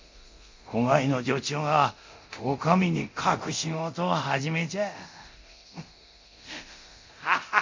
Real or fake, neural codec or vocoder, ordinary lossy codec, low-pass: fake; codec, 24 kHz, 0.9 kbps, DualCodec; MP3, 48 kbps; 7.2 kHz